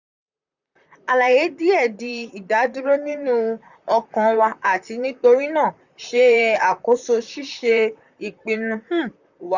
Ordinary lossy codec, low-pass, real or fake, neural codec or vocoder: none; 7.2 kHz; fake; vocoder, 44.1 kHz, 128 mel bands, Pupu-Vocoder